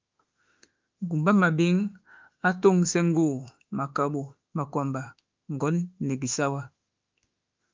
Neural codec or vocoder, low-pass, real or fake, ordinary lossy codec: autoencoder, 48 kHz, 32 numbers a frame, DAC-VAE, trained on Japanese speech; 7.2 kHz; fake; Opus, 24 kbps